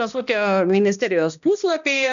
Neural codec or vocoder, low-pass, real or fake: codec, 16 kHz, 1 kbps, X-Codec, HuBERT features, trained on balanced general audio; 7.2 kHz; fake